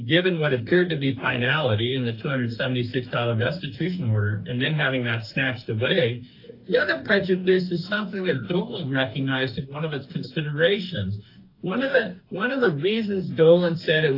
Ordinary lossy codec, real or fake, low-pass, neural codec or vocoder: AAC, 32 kbps; fake; 5.4 kHz; codec, 44.1 kHz, 2.6 kbps, DAC